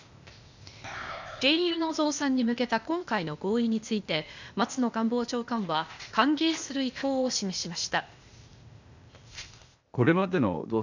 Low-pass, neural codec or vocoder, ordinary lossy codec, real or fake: 7.2 kHz; codec, 16 kHz, 0.8 kbps, ZipCodec; none; fake